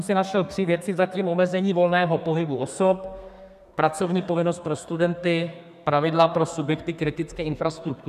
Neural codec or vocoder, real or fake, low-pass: codec, 32 kHz, 1.9 kbps, SNAC; fake; 14.4 kHz